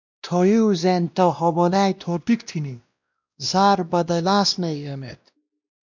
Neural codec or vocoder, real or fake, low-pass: codec, 16 kHz, 1 kbps, X-Codec, WavLM features, trained on Multilingual LibriSpeech; fake; 7.2 kHz